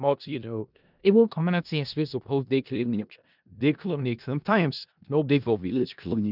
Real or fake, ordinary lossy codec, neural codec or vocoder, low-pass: fake; none; codec, 16 kHz in and 24 kHz out, 0.4 kbps, LongCat-Audio-Codec, four codebook decoder; 5.4 kHz